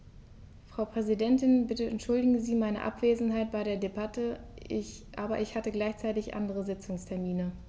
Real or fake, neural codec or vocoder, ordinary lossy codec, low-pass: real; none; none; none